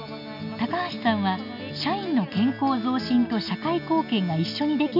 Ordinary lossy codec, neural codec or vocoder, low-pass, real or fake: none; none; 5.4 kHz; real